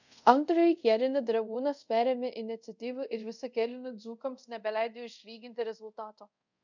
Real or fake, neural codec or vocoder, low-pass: fake; codec, 24 kHz, 0.5 kbps, DualCodec; 7.2 kHz